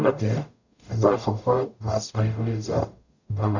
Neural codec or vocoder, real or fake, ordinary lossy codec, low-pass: codec, 44.1 kHz, 0.9 kbps, DAC; fake; none; 7.2 kHz